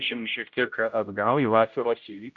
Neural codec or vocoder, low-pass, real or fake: codec, 16 kHz, 0.5 kbps, X-Codec, HuBERT features, trained on general audio; 7.2 kHz; fake